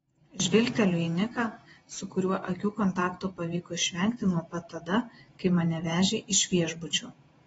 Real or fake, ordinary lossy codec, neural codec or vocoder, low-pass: fake; AAC, 24 kbps; vocoder, 48 kHz, 128 mel bands, Vocos; 19.8 kHz